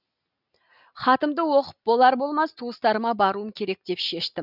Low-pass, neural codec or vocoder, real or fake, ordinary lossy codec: 5.4 kHz; none; real; none